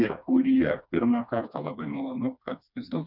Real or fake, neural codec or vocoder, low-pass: fake; codec, 16 kHz, 2 kbps, FreqCodec, smaller model; 5.4 kHz